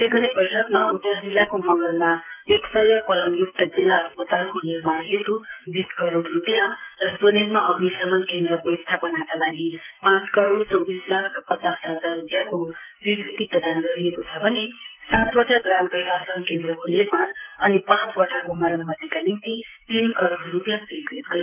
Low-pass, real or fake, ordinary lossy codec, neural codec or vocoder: 3.6 kHz; fake; none; codec, 44.1 kHz, 2.6 kbps, SNAC